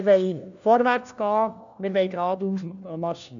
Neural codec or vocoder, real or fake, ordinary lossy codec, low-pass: codec, 16 kHz, 1 kbps, FunCodec, trained on LibriTTS, 50 frames a second; fake; MP3, 96 kbps; 7.2 kHz